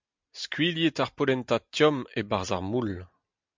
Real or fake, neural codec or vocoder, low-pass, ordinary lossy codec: real; none; 7.2 kHz; MP3, 64 kbps